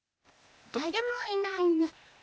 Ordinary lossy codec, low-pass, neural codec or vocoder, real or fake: none; none; codec, 16 kHz, 0.8 kbps, ZipCodec; fake